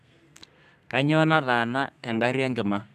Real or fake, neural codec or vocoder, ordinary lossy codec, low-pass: fake; codec, 32 kHz, 1.9 kbps, SNAC; none; 14.4 kHz